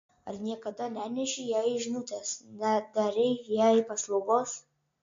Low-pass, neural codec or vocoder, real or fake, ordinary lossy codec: 7.2 kHz; none; real; MP3, 48 kbps